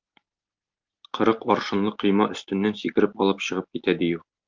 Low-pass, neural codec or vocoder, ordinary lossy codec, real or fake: 7.2 kHz; none; Opus, 24 kbps; real